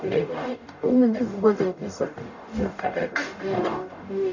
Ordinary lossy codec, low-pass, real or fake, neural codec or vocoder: none; 7.2 kHz; fake; codec, 44.1 kHz, 0.9 kbps, DAC